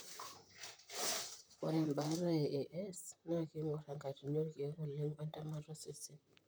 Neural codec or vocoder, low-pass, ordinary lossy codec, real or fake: vocoder, 44.1 kHz, 128 mel bands, Pupu-Vocoder; none; none; fake